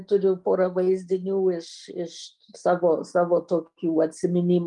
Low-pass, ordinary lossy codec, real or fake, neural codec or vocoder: 10.8 kHz; Opus, 32 kbps; real; none